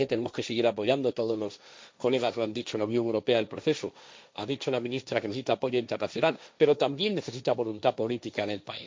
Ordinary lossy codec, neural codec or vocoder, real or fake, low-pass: none; codec, 16 kHz, 1.1 kbps, Voila-Tokenizer; fake; none